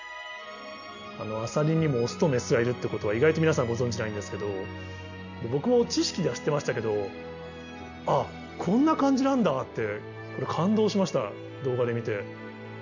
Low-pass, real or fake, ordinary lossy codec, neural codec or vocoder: 7.2 kHz; real; none; none